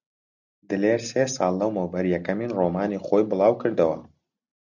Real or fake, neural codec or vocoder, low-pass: real; none; 7.2 kHz